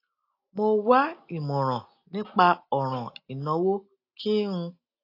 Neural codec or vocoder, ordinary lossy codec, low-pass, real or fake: none; AAC, 32 kbps; 5.4 kHz; real